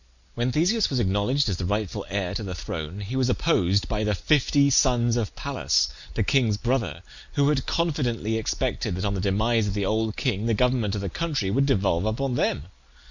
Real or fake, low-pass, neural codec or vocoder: real; 7.2 kHz; none